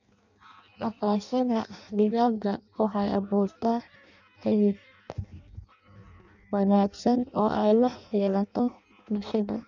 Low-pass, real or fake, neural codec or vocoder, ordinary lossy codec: 7.2 kHz; fake; codec, 16 kHz in and 24 kHz out, 0.6 kbps, FireRedTTS-2 codec; none